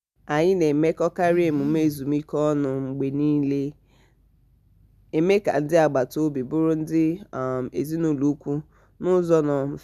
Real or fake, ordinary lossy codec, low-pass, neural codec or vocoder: real; none; 14.4 kHz; none